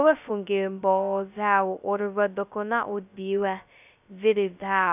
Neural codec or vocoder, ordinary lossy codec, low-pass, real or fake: codec, 16 kHz, 0.2 kbps, FocalCodec; none; 3.6 kHz; fake